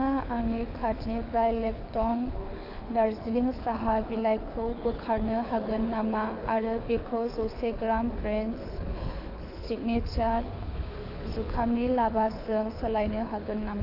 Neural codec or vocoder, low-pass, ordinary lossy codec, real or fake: codec, 24 kHz, 6 kbps, HILCodec; 5.4 kHz; AAC, 32 kbps; fake